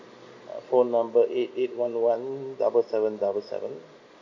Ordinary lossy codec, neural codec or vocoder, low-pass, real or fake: MP3, 48 kbps; none; 7.2 kHz; real